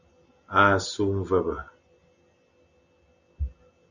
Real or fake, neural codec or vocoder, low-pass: real; none; 7.2 kHz